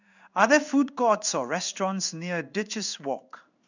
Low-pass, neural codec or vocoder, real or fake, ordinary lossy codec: 7.2 kHz; codec, 16 kHz in and 24 kHz out, 1 kbps, XY-Tokenizer; fake; none